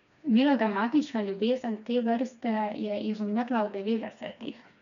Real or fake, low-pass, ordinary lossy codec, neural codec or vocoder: fake; 7.2 kHz; none; codec, 16 kHz, 2 kbps, FreqCodec, smaller model